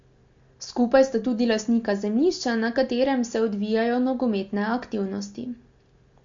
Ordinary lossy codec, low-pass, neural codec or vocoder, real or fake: MP3, 48 kbps; 7.2 kHz; none; real